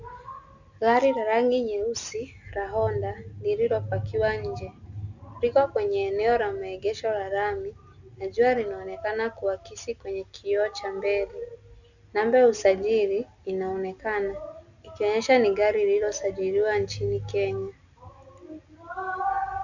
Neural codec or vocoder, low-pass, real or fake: none; 7.2 kHz; real